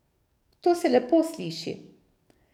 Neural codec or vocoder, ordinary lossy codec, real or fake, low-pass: codec, 44.1 kHz, 7.8 kbps, DAC; none; fake; 19.8 kHz